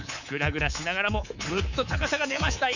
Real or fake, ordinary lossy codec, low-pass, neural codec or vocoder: fake; none; 7.2 kHz; codec, 24 kHz, 3.1 kbps, DualCodec